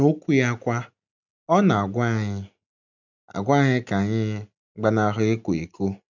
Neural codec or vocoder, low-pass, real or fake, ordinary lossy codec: none; 7.2 kHz; real; none